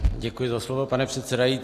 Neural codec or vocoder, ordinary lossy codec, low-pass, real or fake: none; AAC, 64 kbps; 14.4 kHz; real